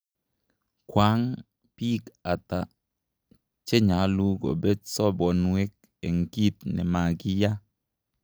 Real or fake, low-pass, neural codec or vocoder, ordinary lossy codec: real; none; none; none